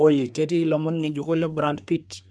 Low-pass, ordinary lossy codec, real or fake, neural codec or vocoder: none; none; fake; codec, 24 kHz, 1 kbps, SNAC